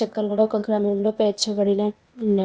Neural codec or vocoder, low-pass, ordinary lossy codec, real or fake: codec, 16 kHz, 0.8 kbps, ZipCodec; none; none; fake